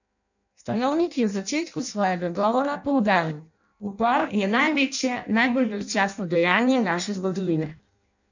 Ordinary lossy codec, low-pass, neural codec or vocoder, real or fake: none; 7.2 kHz; codec, 16 kHz in and 24 kHz out, 0.6 kbps, FireRedTTS-2 codec; fake